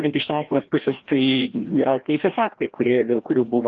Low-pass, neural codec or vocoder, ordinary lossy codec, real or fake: 7.2 kHz; codec, 16 kHz, 1 kbps, FreqCodec, larger model; Opus, 24 kbps; fake